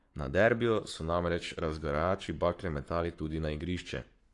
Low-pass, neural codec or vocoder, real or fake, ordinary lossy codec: 10.8 kHz; codec, 44.1 kHz, 7.8 kbps, Pupu-Codec; fake; AAC, 48 kbps